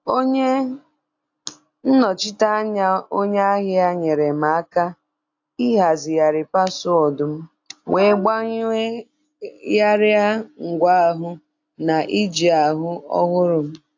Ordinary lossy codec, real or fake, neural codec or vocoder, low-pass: AAC, 48 kbps; real; none; 7.2 kHz